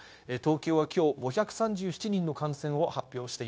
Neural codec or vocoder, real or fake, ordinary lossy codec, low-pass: codec, 16 kHz, 0.9 kbps, LongCat-Audio-Codec; fake; none; none